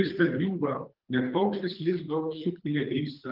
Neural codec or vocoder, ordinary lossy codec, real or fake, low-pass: codec, 24 kHz, 3 kbps, HILCodec; Opus, 24 kbps; fake; 5.4 kHz